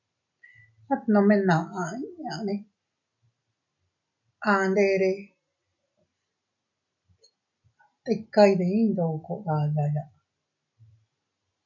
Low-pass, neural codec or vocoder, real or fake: 7.2 kHz; none; real